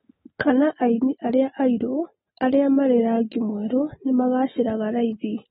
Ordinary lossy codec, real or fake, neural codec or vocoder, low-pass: AAC, 16 kbps; real; none; 14.4 kHz